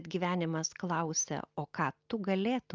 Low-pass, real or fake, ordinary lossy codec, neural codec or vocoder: 7.2 kHz; real; Opus, 24 kbps; none